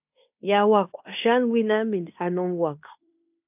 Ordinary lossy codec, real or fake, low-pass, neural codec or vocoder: AAC, 32 kbps; fake; 3.6 kHz; codec, 16 kHz in and 24 kHz out, 0.9 kbps, LongCat-Audio-Codec, fine tuned four codebook decoder